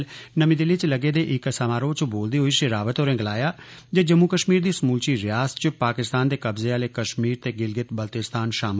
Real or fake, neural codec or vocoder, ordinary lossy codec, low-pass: real; none; none; none